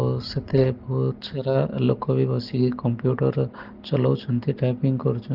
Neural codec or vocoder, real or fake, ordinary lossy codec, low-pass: none; real; Opus, 16 kbps; 5.4 kHz